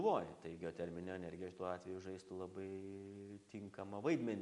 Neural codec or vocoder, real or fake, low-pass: none; real; 10.8 kHz